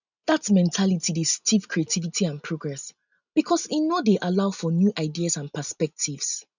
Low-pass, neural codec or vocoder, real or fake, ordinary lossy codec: 7.2 kHz; none; real; none